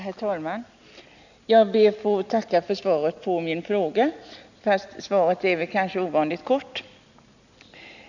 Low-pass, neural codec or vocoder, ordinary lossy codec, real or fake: 7.2 kHz; none; none; real